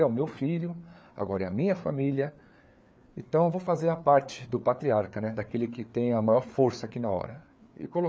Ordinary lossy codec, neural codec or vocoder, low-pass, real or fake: none; codec, 16 kHz, 4 kbps, FreqCodec, larger model; none; fake